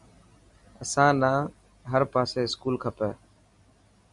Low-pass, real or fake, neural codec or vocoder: 10.8 kHz; real; none